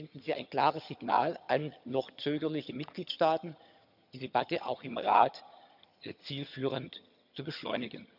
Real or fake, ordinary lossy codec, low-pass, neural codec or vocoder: fake; none; 5.4 kHz; vocoder, 22.05 kHz, 80 mel bands, HiFi-GAN